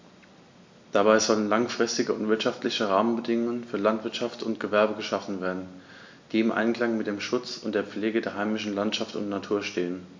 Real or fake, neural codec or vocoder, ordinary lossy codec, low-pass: real; none; MP3, 48 kbps; 7.2 kHz